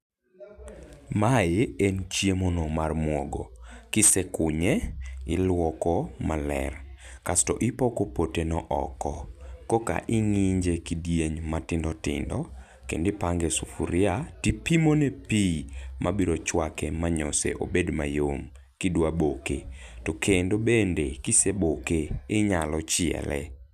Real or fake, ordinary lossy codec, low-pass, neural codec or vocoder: real; none; 14.4 kHz; none